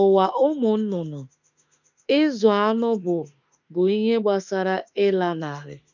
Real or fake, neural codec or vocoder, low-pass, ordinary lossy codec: fake; autoencoder, 48 kHz, 32 numbers a frame, DAC-VAE, trained on Japanese speech; 7.2 kHz; none